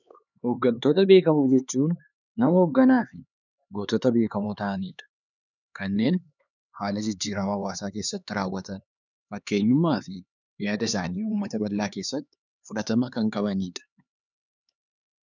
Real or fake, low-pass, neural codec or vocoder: fake; 7.2 kHz; codec, 16 kHz, 4 kbps, X-Codec, HuBERT features, trained on LibriSpeech